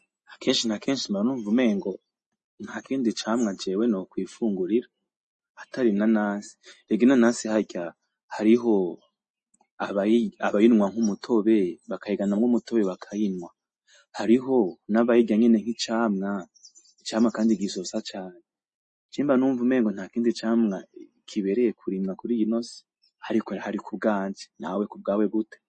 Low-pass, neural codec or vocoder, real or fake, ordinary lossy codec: 10.8 kHz; none; real; MP3, 32 kbps